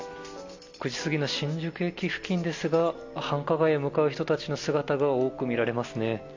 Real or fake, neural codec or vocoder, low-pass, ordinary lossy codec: real; none; 7.2 kHz; MP3, 64 kbps